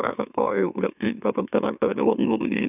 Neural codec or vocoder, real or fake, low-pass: autoencoder, 44.1 kHz, a latent of 192 numbers a frame, MeloTTS; fake; 3.6 kHz